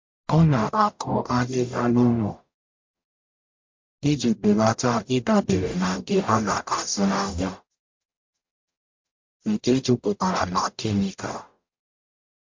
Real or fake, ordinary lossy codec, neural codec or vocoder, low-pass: fake; MP3, 48 kbps; codec, 44.1 kHz, 0.9 kbps, DAC; 7.2 kHz